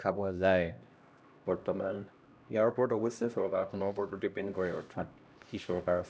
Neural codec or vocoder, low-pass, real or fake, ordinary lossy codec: codec, 16 kHz, 1 kbps, X-Codec, HuBERT features, trained on LibriSpeech; none; fake; none